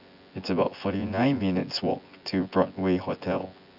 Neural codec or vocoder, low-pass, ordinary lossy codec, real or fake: vocoder, 24 kHz, 100 mel bands, Vocos; 5.4 kHz; AAC, 48 kbps; fake